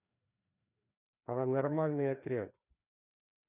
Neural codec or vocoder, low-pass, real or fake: codec, 16 kHz, 2 kbps, FreqCodec, larger model; 3.6 kHz; fake